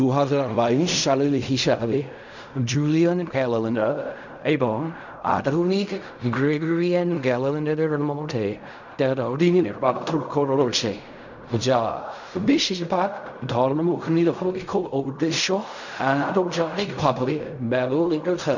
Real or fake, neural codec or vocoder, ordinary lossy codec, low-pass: fake; codec, 16 kHz in and 24 kHz out, 0.4 kbps, LongCat-Audio-Codec, fine tuned four codebook decoder; none; 7.2 kHz